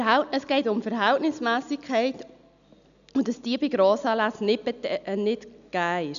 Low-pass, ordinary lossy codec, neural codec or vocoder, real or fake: 7.2 kHz; none; none; real